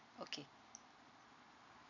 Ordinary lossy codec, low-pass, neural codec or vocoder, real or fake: none; 7.2 kHz; none; real